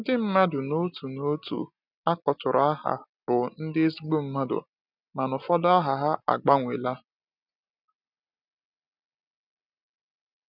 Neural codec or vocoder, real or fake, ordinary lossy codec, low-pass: none; real; none; 5.4 kHz